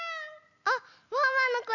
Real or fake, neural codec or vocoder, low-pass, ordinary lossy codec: real; none; 7.2 kHz; none